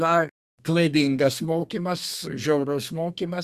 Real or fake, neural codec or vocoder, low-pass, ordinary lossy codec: fake; codec, 32 kHz, 1.9 kbps, SNAC; 14.4 kHz; Opus, 64 kbps